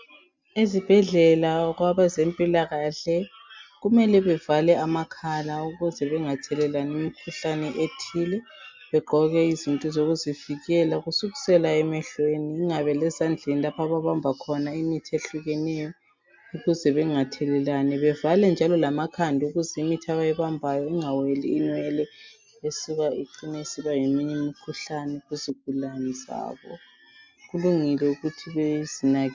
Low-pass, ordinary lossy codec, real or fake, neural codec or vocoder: 7.2 kHz; MP3, 64 kbps; real; none